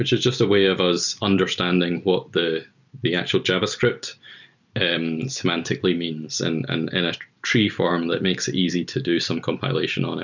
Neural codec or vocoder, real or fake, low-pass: none; real; 7.2 kHz